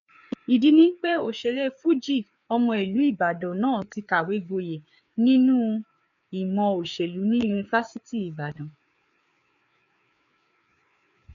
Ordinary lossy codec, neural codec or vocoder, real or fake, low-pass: none; codec, 16 kHz, 4 kbps, FreqCodec, larger model; fake; 7.2 kHz